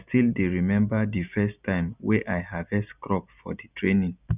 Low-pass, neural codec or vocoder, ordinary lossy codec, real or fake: 3.6 kHz; none; none; real